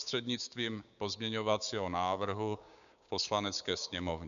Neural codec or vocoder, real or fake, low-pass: codec, 16 kHz, 6 kbps, DAC; fake; 7.2 kHz